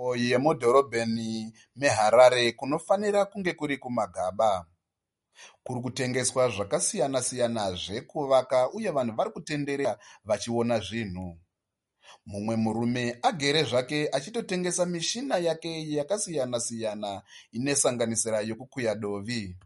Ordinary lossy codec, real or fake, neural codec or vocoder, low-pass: MP3, 48 kbps; real; none; 19.8 kHz